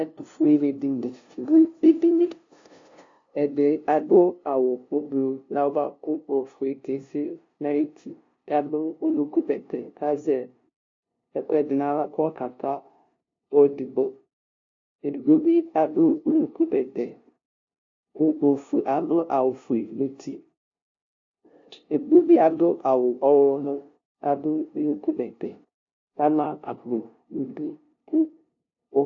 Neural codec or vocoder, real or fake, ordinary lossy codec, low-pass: codec, 16 kHz, 0.5 kbps, FunCodec, trained on LibriTTS, 25 frames a second; fake; AAC, 48 kbps; 7.2 kHz